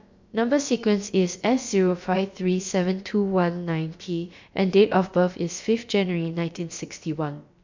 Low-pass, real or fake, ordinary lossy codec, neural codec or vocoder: 7.2 kHz; fake; MP3, 64 kbps; codec, 16 kHz, about 1 kbps, DyCAST, with the encoder's durations